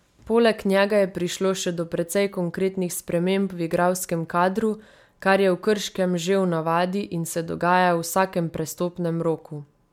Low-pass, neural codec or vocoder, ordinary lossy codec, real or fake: 19.8 kHz; none; MP3, 96 kbps; real